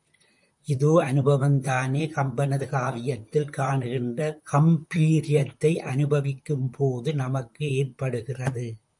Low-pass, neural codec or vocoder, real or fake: 10.8 kHz; vocoder, 44.1 kHz, 128 mel bands, Pupu-Vocoder; fake